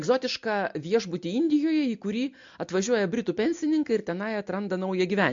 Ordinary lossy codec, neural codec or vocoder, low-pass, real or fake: MP3, 48 kbps; none; 7.2 kHz; real